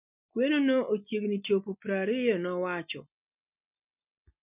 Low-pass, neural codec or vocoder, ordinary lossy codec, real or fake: 3.6 kHz; none; none; real